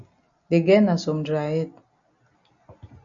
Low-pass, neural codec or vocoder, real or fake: 7.2 kHz; none; real